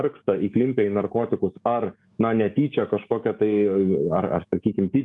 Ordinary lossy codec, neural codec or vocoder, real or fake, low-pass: MP3, 96 kbps; codec, 44.1 kHz, 7.8 kbps, DAC; fake; 10.8 kHz